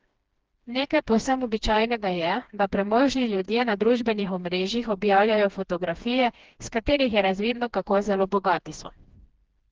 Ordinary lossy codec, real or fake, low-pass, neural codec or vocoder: Opus, 16 kbps; fake; 7.2 kHz; codec, 16 kHz, 2 kbps, FreqCodec, smaller model